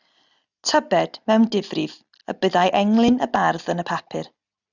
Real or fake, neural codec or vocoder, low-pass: real; none; 7.2 kHz